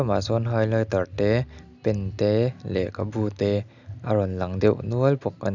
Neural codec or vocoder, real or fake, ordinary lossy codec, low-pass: none; real; none; 7.2 kHz